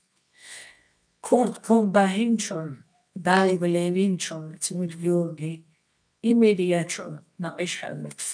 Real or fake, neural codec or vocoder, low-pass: fake; codec, 24 kHz, 0.9 kbps, WavTokenizer, medium music audio release; 9.9 kHz